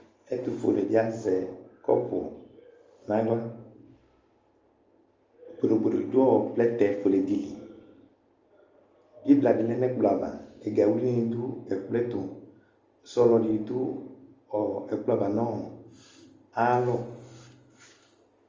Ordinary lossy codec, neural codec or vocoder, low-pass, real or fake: Opus, 32 kbps; none; 7.2 kHz; real